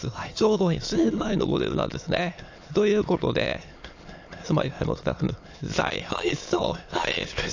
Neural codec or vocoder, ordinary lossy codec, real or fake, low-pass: autoencoder, 22.05 kHz, a latent of 192 numbers a frame, VITS, trained on many speakers; AAC, 48 kbps; fake; 7.2 kHz